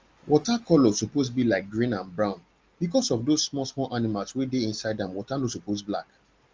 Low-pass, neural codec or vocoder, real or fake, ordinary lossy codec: 7.2 kHz; none; real; Opus, 32 kbps